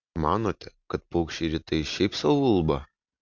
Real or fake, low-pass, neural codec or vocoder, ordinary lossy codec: real; 7.2 kHz; none; Opus, 64 kbps